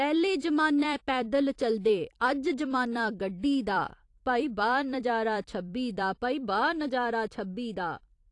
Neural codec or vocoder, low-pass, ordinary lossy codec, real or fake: vocoder, 44.1 kHz, 128 mel bands every 256 samples, BigVGAN v2; 10.8 kHz; AAC, 48 kbps; fake